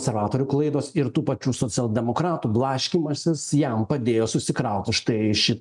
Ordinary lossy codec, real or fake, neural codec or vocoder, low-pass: MP3, 96 kbps; real; none; 10.8 kHz